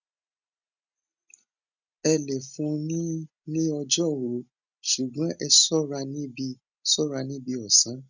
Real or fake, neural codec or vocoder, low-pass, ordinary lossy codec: real; none; 7.2 kHz; none